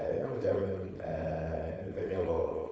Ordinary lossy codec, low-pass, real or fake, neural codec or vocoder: none; none; fake; codec, 16 kHz, 4.8 kbps, FACodec